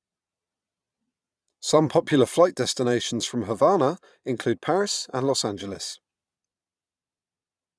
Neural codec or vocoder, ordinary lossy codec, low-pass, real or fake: vocoder, 22.05 kHz, 80 mel bands, Vocos; none; none; fake